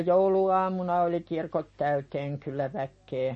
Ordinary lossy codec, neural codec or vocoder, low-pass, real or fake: MP3, 32 kbps; none; 10.8 kHz; real